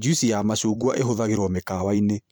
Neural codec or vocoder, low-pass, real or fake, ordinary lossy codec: vocoder, 44.1 kHz, 128 mel bands every 512 samples, BigVGAN v2; none; fake; none